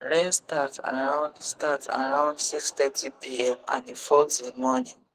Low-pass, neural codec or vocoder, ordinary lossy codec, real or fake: 14.4 kHz; codec, 44.1 kHz, 2.6 kbps, SNAC; Opus, 24 kbps; fake